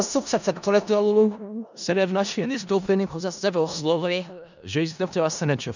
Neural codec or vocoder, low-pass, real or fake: codec, 16 kHz in and 24 kHz out, 0.4 kbps, LongCat-Audio-Codec, four codebook decoder; 7.2 kHz; fake